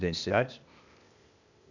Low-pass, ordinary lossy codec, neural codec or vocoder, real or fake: 7.2 kHz; none; codec, 16 kHz, 0.8 kbps, ZipCodec; fake